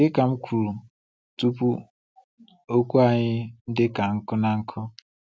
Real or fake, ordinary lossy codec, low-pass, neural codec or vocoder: real; none; none; none